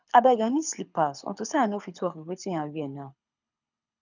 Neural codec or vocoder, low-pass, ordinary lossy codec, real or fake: codec, 24 kHz, 6 kbps, HILCodec; 7.2 kHz; none; fake